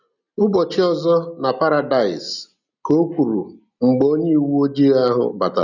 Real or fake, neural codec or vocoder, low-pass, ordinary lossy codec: real; none; 7.2 kHz; none